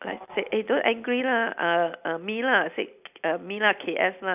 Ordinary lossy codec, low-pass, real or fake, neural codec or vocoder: none; 3.6 kHz; real; none